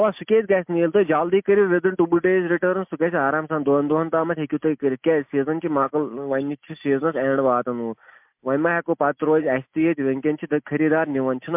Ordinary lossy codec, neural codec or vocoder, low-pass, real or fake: MP3, 32 kbps; none; 3.6 kHz; real